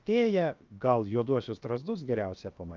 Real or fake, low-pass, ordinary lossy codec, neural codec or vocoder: fake; 7.2 kHz; Opus, 24 kbps; codec, 16 kHz, about 1 kbps, DyCAST, with the encoder's durations